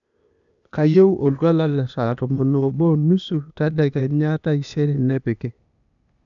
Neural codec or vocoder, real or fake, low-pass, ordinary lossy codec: codec, 16 kHz, 0.8 kbps, ZipCodec; fake; 7.2 kHz; none